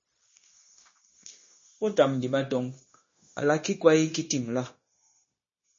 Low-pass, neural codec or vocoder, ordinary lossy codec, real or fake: 7.2 kHz; codec, 16 kHz, 0.9 kbps, LongCat-Audio-Codec; MP3, 32 kbps; fake